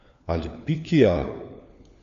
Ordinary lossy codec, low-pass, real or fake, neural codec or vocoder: none; 7.2 kHz; fake; codec, 16 kHz, 4 kbps, FunCodec, trained on LibriTTS, 50 frames a second